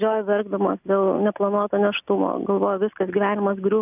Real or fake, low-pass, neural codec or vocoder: real; 3.6 kHz; none